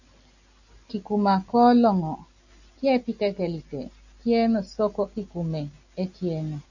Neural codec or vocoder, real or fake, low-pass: none; real; 7.2 kHz